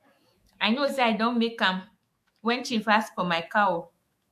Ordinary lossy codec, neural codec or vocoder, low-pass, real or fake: MP3, 64 kbps; autoencoder, 48 kHz, 128 numbers a frame, DAC-VAE, trained on Japanese speech; 14.4 kHz; fake